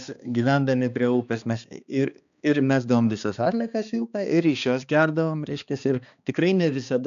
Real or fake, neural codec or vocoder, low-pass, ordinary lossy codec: fake; codec, 16 kHz, 2 kbps, X-Codec, HuBERT features, trained on balanced general audio; 7.2 kHz; MP3, 96 kbps